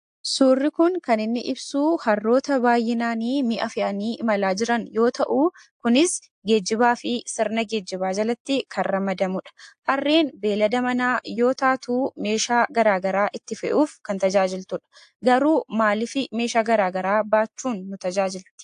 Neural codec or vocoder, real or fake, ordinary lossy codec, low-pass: none; real; AAC, 64 kbps; 9.9 kHz